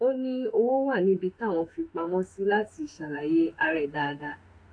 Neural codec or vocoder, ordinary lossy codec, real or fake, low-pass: autoencoder, 48 kHz, 32 numbers a frame, DAC-VAE, trained on Japanese speech; AAC, 48 kbps; fake; 9.9 kHz